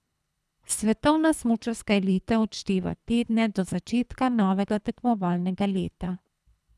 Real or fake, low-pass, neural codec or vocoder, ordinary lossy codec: fake; none; codec, 24 kHz, 3 kbps, HILCodec; none